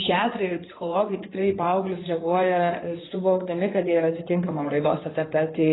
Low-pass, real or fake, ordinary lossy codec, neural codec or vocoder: 7.2 kHz; fake; AAC, 16 kbps; codec, 16 kHz in and 24 kHz out, 2.2 kbps, FireRedTTS-2 codec